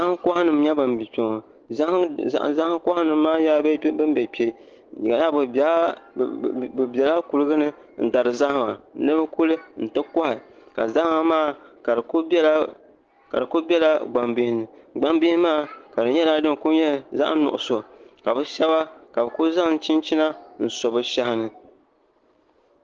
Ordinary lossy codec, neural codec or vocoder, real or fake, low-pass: Opus, 16 kbps; none; real; 7.2 kHz